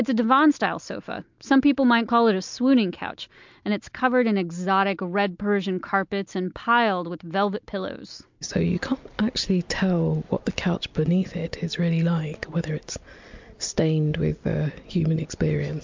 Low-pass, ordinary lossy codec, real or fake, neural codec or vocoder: 7.2 kHz; MP3, 64 kbps; real; none